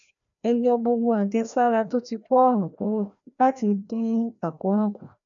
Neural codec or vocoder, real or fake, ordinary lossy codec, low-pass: codec, 16 kHz, 1 kbps, FreqCodec, larger model; fake; MP3, 96 kbps; 7.2 kHz